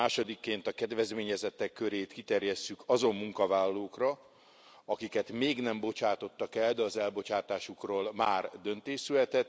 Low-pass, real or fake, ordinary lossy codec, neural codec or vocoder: none; real; none; none